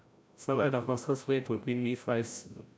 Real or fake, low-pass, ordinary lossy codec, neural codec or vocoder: fake; none; none; codec, 16 kHz, 0.5 kbps, FreqCodec, larger model